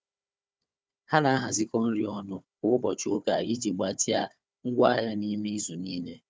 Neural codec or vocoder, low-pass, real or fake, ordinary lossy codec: codec, 16 kHz, 4 kbps, FunCodec, trained on Chinese and English, 50 frames a second; none; fake; none